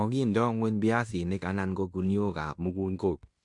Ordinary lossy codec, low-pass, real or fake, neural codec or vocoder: MP3, 64 kbps; 10.8 kHz; fake; codec, 16 kHz in and 24 kHz out, 0.9 kbps, LongCat-Audio-Codec, fine tuned four codebook decoder